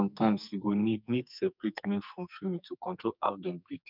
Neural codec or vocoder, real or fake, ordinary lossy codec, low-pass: codec, 44.1 kHz, 2.6 kbps, SNAC; fake; none; 5.4 kHz